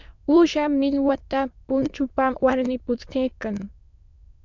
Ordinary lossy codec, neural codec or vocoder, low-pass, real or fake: MP3, 64 kbps; autoencoder, 22.05 kHz, a latent of 192 numbers a frame, VITS, trained on many speakers; 7.2 kHz; fake